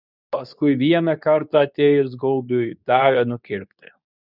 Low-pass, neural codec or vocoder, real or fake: 5.4 kHz; codec, 24 kHz, 0.9 kbps, WavTokenizer, medium speech release version 2; fake